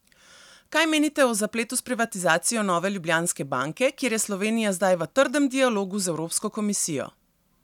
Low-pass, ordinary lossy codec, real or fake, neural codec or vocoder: 19.8 kHz; none; real; none